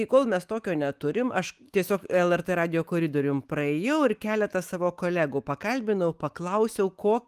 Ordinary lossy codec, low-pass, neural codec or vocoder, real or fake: Opus, 24 kbps; 14.4 kHz; autoencoder, 48 kHz, 128 numbers a frame, DAC-VAE, trained on Japanese speech; fake